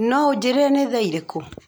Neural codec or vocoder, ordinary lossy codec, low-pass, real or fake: none; none; none; real